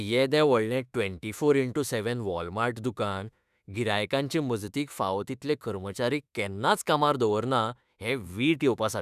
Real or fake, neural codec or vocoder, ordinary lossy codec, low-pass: fake; autoencoder, 48 kHz, 32 numbers a frame, DAC-VAE, trained on Japanese speech; none; 14.4 kHz